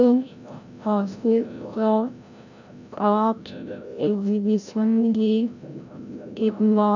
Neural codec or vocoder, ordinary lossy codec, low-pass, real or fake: codec, 16 kHz, 0.5 kbps, FreqCodec, larger model; none; 7.2 kHz; fake